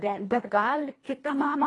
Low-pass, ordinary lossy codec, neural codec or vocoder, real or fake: 10.8 kHz; AAC, 32 kbps; codec, 24 kHz, 1.5 kbps, HILCodec; fake